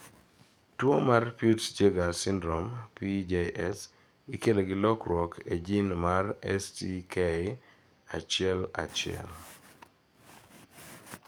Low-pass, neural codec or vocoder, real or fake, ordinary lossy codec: none; codec, 44.1 kHz, 7.8 kbps, DAC; fake; none